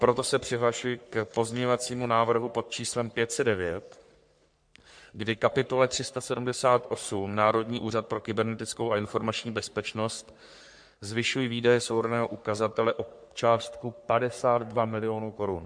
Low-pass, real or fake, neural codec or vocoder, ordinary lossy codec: 9.9 kHz; fake; codec, 44.1 kHz, 3.4 kbps, Pupu-Codec; MP3, 64 kbps